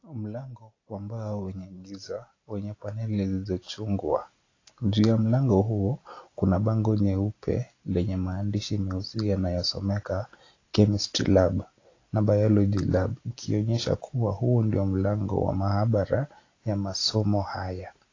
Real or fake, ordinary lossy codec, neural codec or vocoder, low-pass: real; AAC, 32 kbps; none; 7.2 kHz